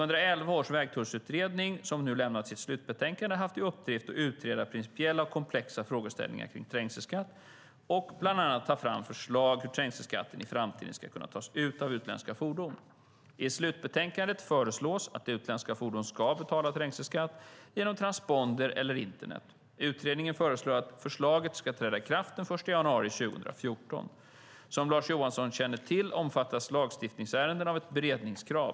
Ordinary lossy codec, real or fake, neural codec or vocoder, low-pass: none; real; none; none